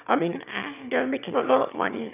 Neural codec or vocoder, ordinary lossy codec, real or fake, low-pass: autoencoder, 22.05 kHz, a latent of 192 numbers a frame, VITS, trained on one speaker; none; fake; 3.6 kHz